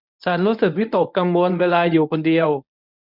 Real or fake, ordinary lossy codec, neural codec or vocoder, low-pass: fake; none; codec, 24 kHz, 0.9 kbps, WavTokenizer, medium speech release version 1; 5.4 kHz